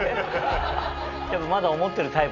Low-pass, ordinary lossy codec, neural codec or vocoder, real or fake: 7.2 kHz; none; none; real